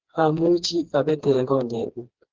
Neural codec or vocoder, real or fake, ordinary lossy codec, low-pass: codec, 16 kHz, 2 kbps, FreqCodec, smaller model; fake; Opus, 16 kbps; 7.2 kHz